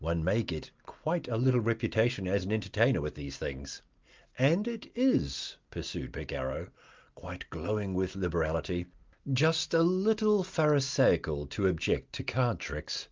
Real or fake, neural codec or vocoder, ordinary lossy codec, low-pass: real; none; Opus, 24 kbps; 7.2 kHz